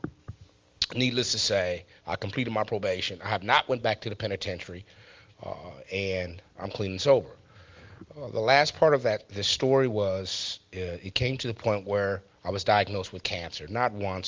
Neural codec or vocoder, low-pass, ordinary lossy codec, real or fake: none; 7.2 kHz; Opus, 32 kbps; real